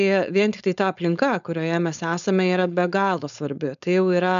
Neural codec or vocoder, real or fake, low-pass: codec, 16 kHz, 4.8 kbps, FACodec; fake; 7.2 kHz